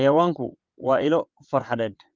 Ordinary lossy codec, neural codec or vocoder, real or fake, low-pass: Opus, 32 kbps; vocoder, 22.05 kHz, 80 mel bands, WaveNeXt; fake; 7.2 kHz